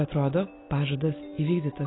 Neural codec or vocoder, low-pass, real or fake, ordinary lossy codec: none; 7.2 kHz; real; AAC, 16 kbps